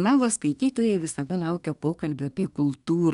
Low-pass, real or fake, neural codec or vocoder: 10.8 kHz; fake; codec, 24 kHz, 1 kbps, SNAC